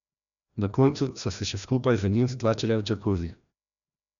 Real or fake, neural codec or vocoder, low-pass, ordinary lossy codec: fake; codec, 16 kHz, 1 kbps, FreqCodec, larger model; 7.2 kHz; none